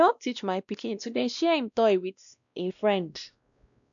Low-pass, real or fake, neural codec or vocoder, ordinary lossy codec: 7.2 kHz; fake; codec, 16 kHz, 1 kbps, X-Codec, WavLM features, trained on Multilingual LibriSpeech; none